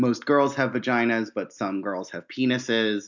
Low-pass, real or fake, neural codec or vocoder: 7.2 kHz; real; none